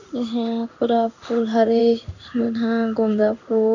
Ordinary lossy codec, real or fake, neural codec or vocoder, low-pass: none; fake; codec, 16 kHz in and 24 kHz out, 1 kbps, XY-Tokenizer; 7.2 kHz